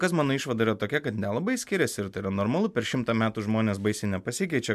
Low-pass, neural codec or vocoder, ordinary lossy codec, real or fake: 14.4 kHz; none; MP3, 96 kbps; real